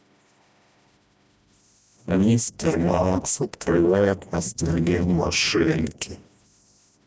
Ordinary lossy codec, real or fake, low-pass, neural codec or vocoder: none; fake; none; codec, 16 kHz, 1 kbps, FreqCodec, smaller model